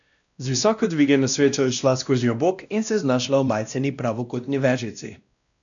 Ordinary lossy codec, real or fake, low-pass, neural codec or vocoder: none; fake; 7.2 kHz; codec, 16 kHz, 1 kbps, X-Codec, WavLM features, trained on Multilingual LibriSpeech